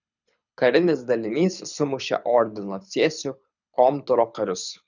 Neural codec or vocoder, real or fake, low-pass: codec, 24 kHz, 6 kbps, HILCodec; fake; 7.2 kHz